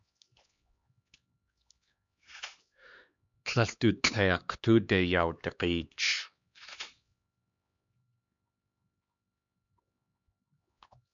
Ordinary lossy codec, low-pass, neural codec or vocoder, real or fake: MP3, 64 kbps; 7.2 kHz; codec, 16 kHz, 2 kbps, X-Codec, HuBERT features, trained on LibriSpeech; fake